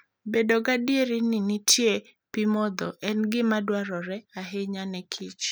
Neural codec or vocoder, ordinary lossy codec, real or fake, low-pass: none; none; real; none